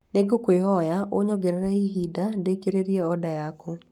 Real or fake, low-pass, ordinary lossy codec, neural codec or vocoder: fake; 19.8 kHz; none; codec, 44.1 kHz, 7.8 kbps, Pupu-Codec